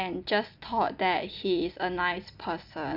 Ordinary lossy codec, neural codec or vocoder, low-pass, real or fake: none; none; 5.4 kHz; real